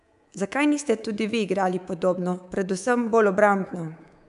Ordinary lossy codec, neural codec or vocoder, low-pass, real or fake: none; codec, 24 kHz, 3.1 kbps, DualCodec; 10.8 kHz; fake